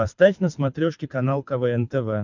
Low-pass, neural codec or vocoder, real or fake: 7.2 kHz; codec, 24 kHz, 6 kbps, HILCodec; fake